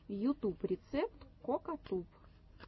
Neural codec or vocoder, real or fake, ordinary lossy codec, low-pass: none; real; MP3, 24 kbps; 7.2 kHz